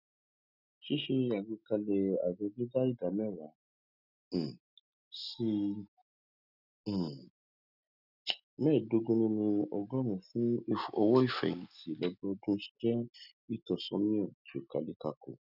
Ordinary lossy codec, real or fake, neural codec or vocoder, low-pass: none; real; none; 5.4 kHz